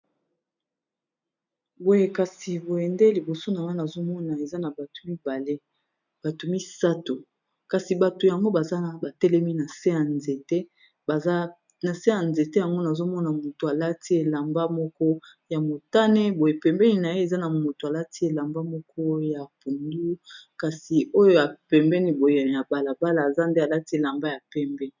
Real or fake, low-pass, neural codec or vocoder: real; 7.2 kHz; none